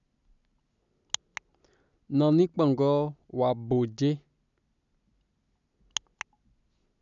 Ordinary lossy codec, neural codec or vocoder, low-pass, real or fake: none; none; 7.2 kHz; real